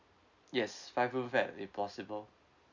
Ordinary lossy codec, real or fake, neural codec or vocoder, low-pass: none; real; none; 7.2 kHz